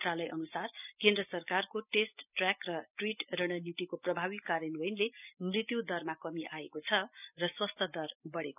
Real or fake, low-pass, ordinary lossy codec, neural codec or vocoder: real; 3.6 kHz; none; none